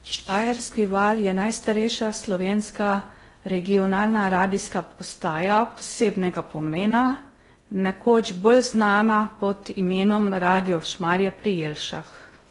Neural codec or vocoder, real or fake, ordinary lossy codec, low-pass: codec, 16 kHz in and 24 kHz out, 0.6 kbps, FocalCodec, streaming, 2048 codes; fake; AAC, 32 kbps; 10.8 kHz